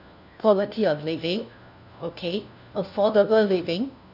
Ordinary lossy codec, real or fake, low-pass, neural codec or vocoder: none; fake; 5.4 kHz; codec, 16 kHz, 1 kbps, FunCodec, trained on LibriTTS, 50 frames a second